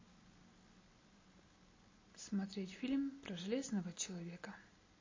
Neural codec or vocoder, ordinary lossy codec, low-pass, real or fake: none; MP3, 32 kbps; 7.2 kHz; real